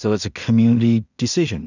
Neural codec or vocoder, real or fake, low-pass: codec, 16 kHz in and 24 kHz out, 0.4 kbps, LongCat-Audio-Codec, two codebook decoder; fake; 7.2 kHz